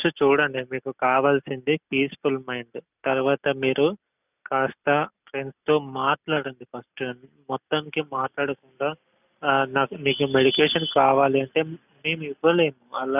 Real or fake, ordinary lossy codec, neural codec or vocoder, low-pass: real; none; none; 3.6 kHz